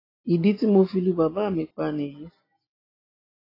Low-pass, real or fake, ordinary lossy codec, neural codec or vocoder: 5.4 kHz; real; AAC, 24 kbps; none